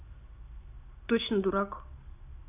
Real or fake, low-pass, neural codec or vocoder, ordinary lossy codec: real; 3.6 kHz; none; AAC, 32 kbps